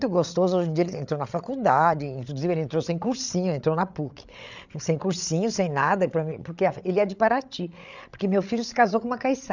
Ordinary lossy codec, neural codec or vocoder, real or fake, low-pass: none; codec, 16 kHz, 8 kbps, FreqCodec, larger model; fake; 7.2 kHz